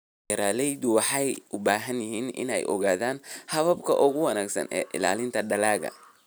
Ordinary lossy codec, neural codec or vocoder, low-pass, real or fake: none; none; none; real